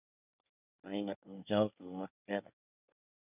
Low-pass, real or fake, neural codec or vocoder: 3.6 kHz; fake; codec, 24 kHz, 1 kbps, SNAC